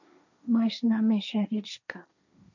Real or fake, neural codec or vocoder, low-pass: fake; codec, 16 kHz, 1.1 kbps, Voila-Tokenizer; 7.2 kHz